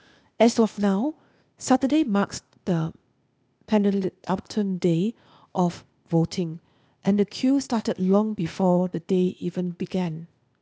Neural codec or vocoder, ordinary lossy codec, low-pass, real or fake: codec, 16 kHz, 0.8 kbps, ZipCodec; none; none; fake